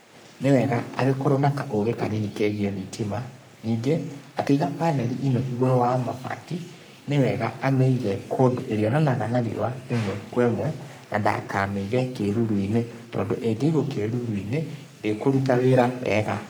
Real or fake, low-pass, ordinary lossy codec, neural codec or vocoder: fake; none; none; codec, 44.1 kHz, 3.4 kbps, Pupu-Codec